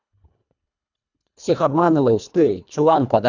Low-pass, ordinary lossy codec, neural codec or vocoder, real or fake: 7.2 kHz; none; codec, 24 kHz, 1.5 kbps, HILCodec; fake